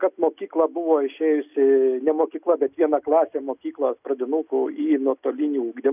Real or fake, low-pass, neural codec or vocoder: real; 3.6 kHz; none